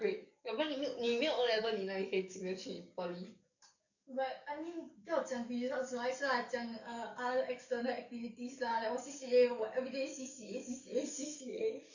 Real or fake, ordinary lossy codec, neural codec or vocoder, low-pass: fake; none; codec, 44.1 kHz, 7.8 kbps, DAC; 7.2 kHz